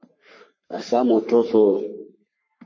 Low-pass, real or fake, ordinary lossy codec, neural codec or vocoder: 7.2 kHz; fake; MP3, 32 kbps; codec, 44.1 kHz, 3.4 kbps, Pupu-Codec